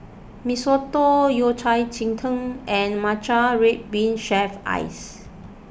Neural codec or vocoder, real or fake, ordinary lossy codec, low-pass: none; real; none; none